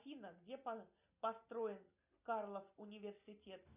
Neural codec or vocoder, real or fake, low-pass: none; real; 3.6 kHz